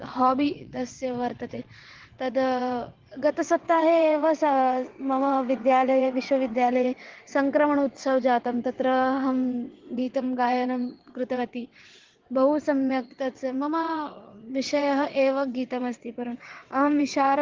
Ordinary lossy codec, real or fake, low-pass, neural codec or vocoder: Opus, 16 kbps; fake; 7.2 kHz; vocoder, 22.05 kHz, 80 mel bands, WaveNeXt